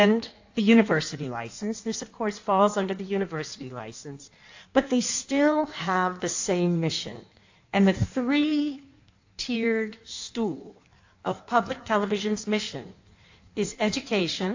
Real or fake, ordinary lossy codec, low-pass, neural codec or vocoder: fake; AAC, 48 kbps; 7.2 kHz; codec, 16 kHz in and 24 kHz out, 1.1 kbps, FireRedTTS-2 codec